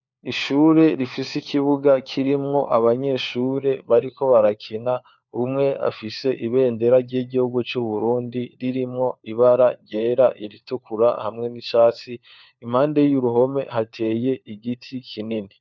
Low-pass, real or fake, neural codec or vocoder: 7.2 kHz; fake; codec, 16 kHz, 4 kbps, FunCodec, trained on LibriTTS, 50 frames a second